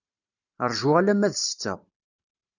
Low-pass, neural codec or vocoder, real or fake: 7.2 kHz; vocoder, 44.1 kHz, 128 mel bands every 512 samples, BigVGAN v2; fake